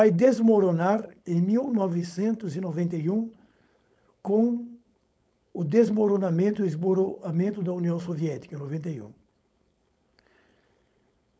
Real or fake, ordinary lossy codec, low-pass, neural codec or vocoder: fake; none; none; codec, 16 kHz, 4.8 kbps, FACodec